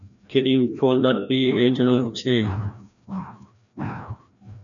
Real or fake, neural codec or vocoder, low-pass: fake; codec, 16 kHz, 1 kbps, FreqCodec, larger model; 7.2 kHz